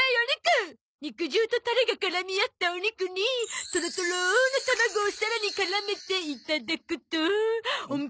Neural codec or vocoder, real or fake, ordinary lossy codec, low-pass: none; real; none; none